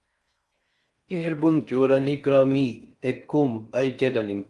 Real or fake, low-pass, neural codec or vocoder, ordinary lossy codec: fake; 10.8 kHz; codec, 16 kHz in and 24 kHz out, 0.6 kbps, FocalCodec, streaming, 4096 codes; Opus, 32 kbps